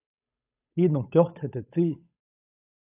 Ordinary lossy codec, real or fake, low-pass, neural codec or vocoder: AAC, 32 kbps; fake; 3.6 kHz; codec, 16 kHz, 8 kbps, FunCodec, trained on Chinese and English, 25 frames a second